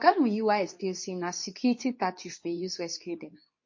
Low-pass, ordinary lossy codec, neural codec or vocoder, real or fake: 7.2 kHz; MP3, 32 kbps; codec, 24 kHz, 0.9 kbps, WavTokenizer, medium speech release version 2; fake